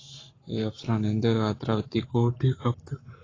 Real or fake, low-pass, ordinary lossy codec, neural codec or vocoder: fake; 7.2 kHz; AAC, 32 kbps; codec, 44.1 kHz, 7.8 kbps, Pupu-Codec